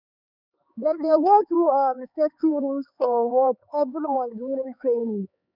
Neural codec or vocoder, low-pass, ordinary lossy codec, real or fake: codec, 16 kHz, 4 kbps, X-Codec, HuBERT features, trained on LibriSpeech; 5.4 kHz; none; fake